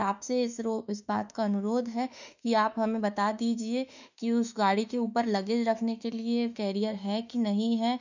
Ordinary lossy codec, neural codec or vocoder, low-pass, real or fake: none; autoencoder, 48 kHz, 32 numbers a frame, DAC-VAE, trained on Japanese speech; 7.2 kHz; fake